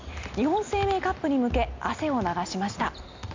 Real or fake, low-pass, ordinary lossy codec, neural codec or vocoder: real; 7.2 kHz; MP3, 64 kbps; none